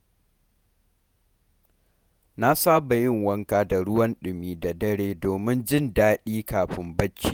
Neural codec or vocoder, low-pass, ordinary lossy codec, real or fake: vocoder, 48 kHz, 128 mel bands, Vocos; none; none; fake